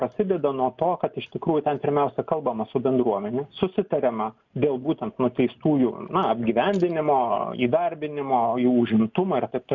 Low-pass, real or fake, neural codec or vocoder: 7.2 kHz; real; none